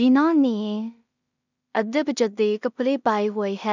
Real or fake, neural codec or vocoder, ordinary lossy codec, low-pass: fake; codec, 16 kHz in and 24 kHz out, 0.4 kbps, LongCat-Audio-Codec, two codebook decoder; none; 7.2 kHz